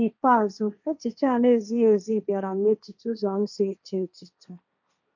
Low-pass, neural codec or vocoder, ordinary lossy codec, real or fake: 7.2 kHz; codec, 16 kHz, 1.1 kbps, Voila-Tokenizer; none; fake